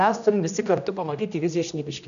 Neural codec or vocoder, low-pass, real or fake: codec, 16 kHz, 1 kbps, X-Codec, HuBERT features, trained on general audio; 7.2 kHz; fake